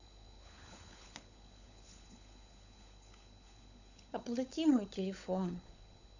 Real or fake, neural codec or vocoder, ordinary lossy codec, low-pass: fake; codec, 16 kHz, 16 kbps, FunCodec, trained on LibriTTS, 50 frames a second; none; 7.2 kHz